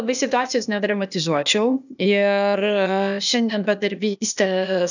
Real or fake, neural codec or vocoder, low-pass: fake; codec, 16 kHz, 0.8 kbps, ZipCodec; 7.2 kHz